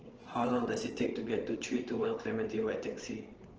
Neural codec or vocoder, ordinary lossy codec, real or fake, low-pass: codec, 16 kHz, 8 kbps, FreqCodec, larger model; Opus, 16 kbps; fake; 7.2 kHz